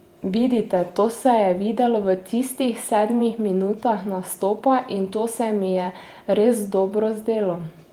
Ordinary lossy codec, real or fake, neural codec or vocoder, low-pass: Opus, 32 kbps; fake; vocoder, 48 kHz, 128 mel bands, Vocos; 19.8 kHz